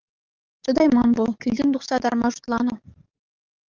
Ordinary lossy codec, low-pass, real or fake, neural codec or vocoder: Opus, 24 kbps; 7.2 kHz; fake; codec, 44.1 kHz, 7.8 kbps, DAC